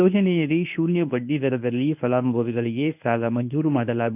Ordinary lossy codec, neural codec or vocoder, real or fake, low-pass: none; codec, 24 kHz, 0.9 kbps, WavTokenizer, medium speech release version 1; fake; 3.6 kHz